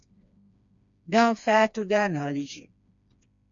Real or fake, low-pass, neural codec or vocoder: fake; 7.2 kHz; codec, 16 kHz, 2 kbps, FreqCodec, smaller model